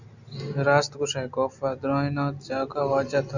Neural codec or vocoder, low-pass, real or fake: none; 7.2 kHz; real